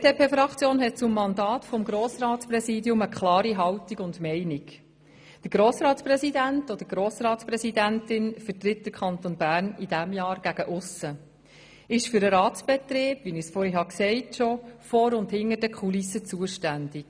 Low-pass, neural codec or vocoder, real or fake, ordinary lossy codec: none; none; real; none